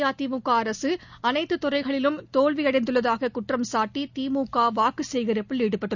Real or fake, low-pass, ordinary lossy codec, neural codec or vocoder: real; 7.2 kHz; none; none